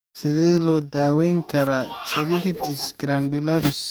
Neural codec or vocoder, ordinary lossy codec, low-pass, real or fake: codec, 44.1 kHz, 2.6 kbps, DAC; none; none; fake